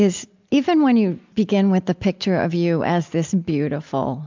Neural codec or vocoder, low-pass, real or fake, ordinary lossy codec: none; 7.2 kHz; real; MP3, 64 kbps